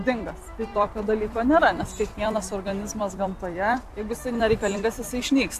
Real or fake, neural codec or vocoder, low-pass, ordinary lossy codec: fake; vocoder, 44.1 kHz, 128 mel bands every 256 samples, BigVGAN v2; 14.4 kHz; AAC, 64 kbps